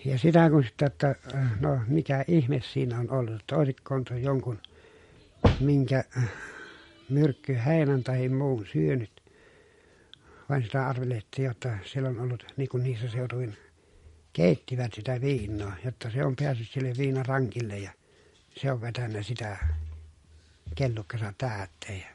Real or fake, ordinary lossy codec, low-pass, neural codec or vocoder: real; MP3, 48 kbps; 19.8 kHz; none